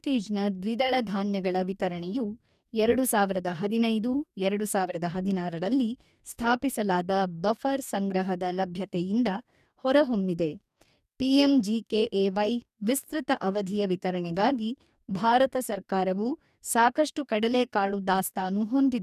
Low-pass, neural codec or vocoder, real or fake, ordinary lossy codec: 14.4 kHz; codec, 44.1 kHz, 2.6 kbps, DAC; fake; none